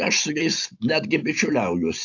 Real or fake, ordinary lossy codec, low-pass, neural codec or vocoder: real; AAC, 48 kbps; 7.2 kHz; none